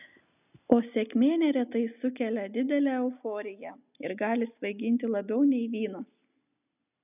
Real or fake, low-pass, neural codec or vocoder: real; 3.6 kHz; none